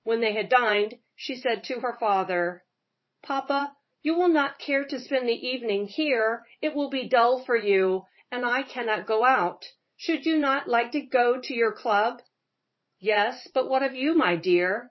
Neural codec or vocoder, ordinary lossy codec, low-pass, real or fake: vocoder, 44.1 kHz, 80 mel bands, Vocos; MP3, 24 kbps; 7.2 kHz; fake